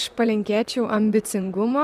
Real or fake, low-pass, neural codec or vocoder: fake; 14.4 kHz; vocoder, 44.1 kHz, 128 mel bands, Pupu-Vocoder